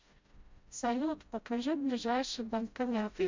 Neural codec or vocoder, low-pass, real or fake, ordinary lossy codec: codec, 16 kHz, 0.5 kbps, FreqCodec, smaller model; 7.2 kHz; fake; MP3, 64 kbps